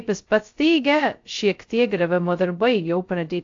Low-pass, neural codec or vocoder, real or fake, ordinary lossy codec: 7.2 kHz; codec, 16 kHz, 0.2 kbps, FocalCodec; fake; AAC, 48 kbps